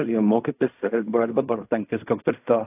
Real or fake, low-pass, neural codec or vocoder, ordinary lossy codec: fake; 3.6 kHz; codec, 16 kHz in and 24 kHz out, 0.4 kbps, LongCat-Audio-Codec, fine tuned four codebook decoder; AAC, 32 kbps